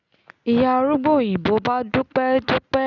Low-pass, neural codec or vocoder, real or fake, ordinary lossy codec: 7.2 kHz; none; real; none